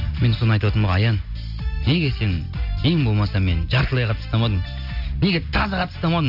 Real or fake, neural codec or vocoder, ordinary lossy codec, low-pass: real; none; none; 5.4 kHz